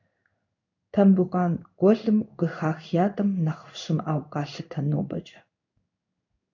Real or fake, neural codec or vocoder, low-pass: fake; codec, 16 kHz in and 24 kHz out, 1 kbps, XY-Tokenizer; 7.2 kHz